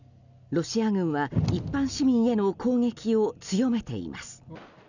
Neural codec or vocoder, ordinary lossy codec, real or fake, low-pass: none; none; real; 7.2 kHz